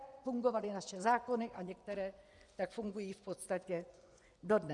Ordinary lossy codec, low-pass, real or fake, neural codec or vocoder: Opus, 64 kbps; 10.8 kHz; fake; vocoder, 24 kHz, 100 mel bands, Vocos